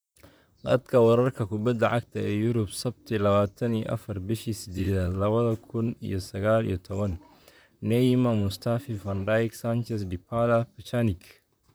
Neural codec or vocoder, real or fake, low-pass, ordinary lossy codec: vocoder, 44.1 kHz, 128 mel bands, Pupu-Vocoder; fake; none; none